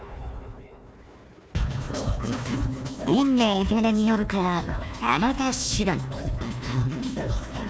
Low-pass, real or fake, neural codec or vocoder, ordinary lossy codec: none; fake; codec, 16 kHz, 1 kbps, FunCodec, trained on Chinese and English, 50 frames a second; none